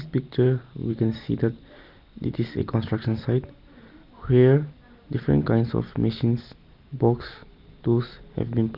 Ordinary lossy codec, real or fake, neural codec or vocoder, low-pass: Opus, 24 kbps; real; none; 5.4 kHz